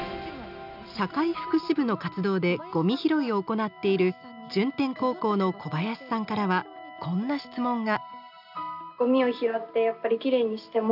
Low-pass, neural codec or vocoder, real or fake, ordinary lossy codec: 5.4 kHz; none; real; none